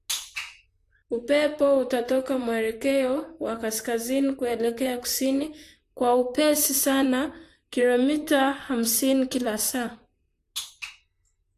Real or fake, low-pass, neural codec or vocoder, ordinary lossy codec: fake; 14.4 kHz; vocoder, 44.1 kHz, 128 mel bands, Pupu-Vocoder; AAC, 64 kbps